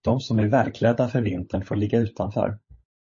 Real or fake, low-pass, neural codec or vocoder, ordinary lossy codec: fake; 7.2 kHz; codec, 16 kHz, 16 kbps, FunCodec, trained on LibriTTS, 50 frames a second; MP3, 32 kbps